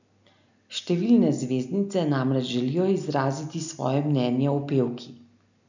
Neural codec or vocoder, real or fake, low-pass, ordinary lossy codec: none; real; 7.2 kHz; none